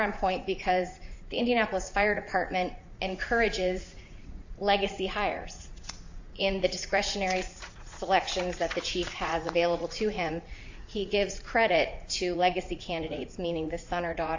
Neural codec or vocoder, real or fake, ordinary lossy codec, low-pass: vocoder, 44.1 kHz, 80 mel bands, Vocos; fake; AAC, 48 kbps; 7.2 kHz